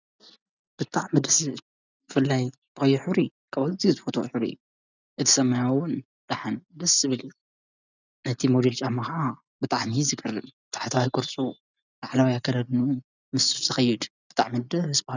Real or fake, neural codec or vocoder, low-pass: real; none; 7.2 kHz